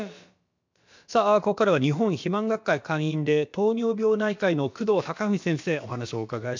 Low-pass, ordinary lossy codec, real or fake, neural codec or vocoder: 7.2 kHz; MP3, 64 kbps; fake; codec, 16 kHz, about 1 kbps, DyCAST, with the encoder's durations